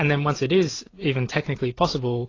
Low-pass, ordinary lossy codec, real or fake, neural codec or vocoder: 7.2 kHz; AAC, 32 kbps; fake; vocoder, 44.1 kHz, 128 mel bands every 512 samples, BigVGAN v2